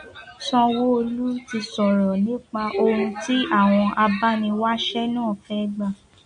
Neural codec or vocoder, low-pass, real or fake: none; 9.9 kHz; real